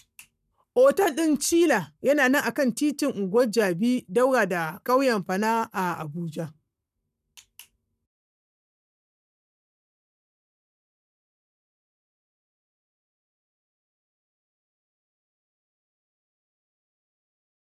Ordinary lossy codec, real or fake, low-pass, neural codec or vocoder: none; fake; 14.4 kHz; codec, 44.1 kHz, 7.8 kbps, Pupu-Codec